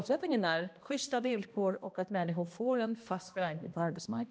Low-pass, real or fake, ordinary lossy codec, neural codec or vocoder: none; fake; none; codec, 16 kHz, 1 kbps, X-Codec, HuBERT features, trained on balanced general audio